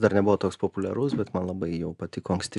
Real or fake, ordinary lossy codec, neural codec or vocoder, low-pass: real; MP3, 96 kbps; none; 10.8 kHz